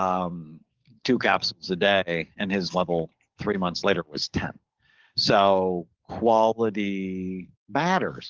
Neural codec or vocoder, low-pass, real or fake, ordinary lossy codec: codec, 44.1 kHz, 7.8 kbps, DAC; 7.2 kHz; fake; Opus, 16 kbps